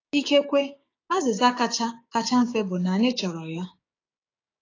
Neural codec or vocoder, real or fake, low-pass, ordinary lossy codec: codec, 16 kHz, 6 kbps, DAC; fake; 7.2 kHz; AAC, 32 kbps